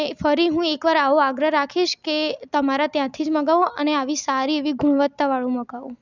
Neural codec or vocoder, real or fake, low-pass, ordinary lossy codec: none; real; 7.2 kHz; none